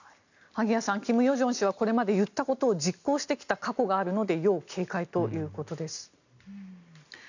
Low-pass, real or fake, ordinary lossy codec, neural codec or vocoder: 7.2 kHz; real; none; none